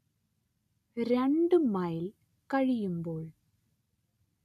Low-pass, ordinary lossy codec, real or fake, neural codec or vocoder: 14.4 kHz; MP3, 96 kbps; real; none